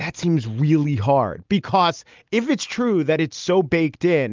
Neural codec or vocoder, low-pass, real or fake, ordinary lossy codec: none; 7.2 kHz; real; Opus, 24 kbps